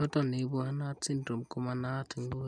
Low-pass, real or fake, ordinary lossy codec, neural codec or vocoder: 9.9 kHz; real; none; none